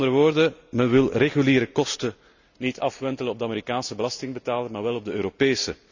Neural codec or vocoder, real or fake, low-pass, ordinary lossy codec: none; real; 7.2 kHz; none